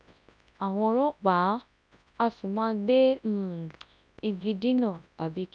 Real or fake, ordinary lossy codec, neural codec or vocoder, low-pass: fake; none; codec, 24 kHz, 0.9 kbps, WavTokenizer, large speech release; 9.9 kHz